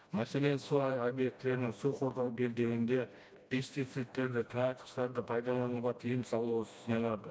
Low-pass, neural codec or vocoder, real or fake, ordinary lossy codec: none; codec, 16 kHz, 1 kbps, FreqCodec, smaller model; fake; none